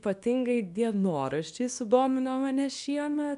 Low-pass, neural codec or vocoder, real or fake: 10.8 kHz; codec, 24 kHz, 0.9 kbps, WavTokenizer, small release; fake